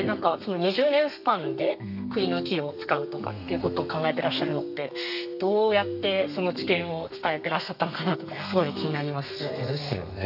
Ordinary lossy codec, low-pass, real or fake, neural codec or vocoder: none; 5.4 kHz; fake; codec, 32 kHz, 1.9 kbps, SNAC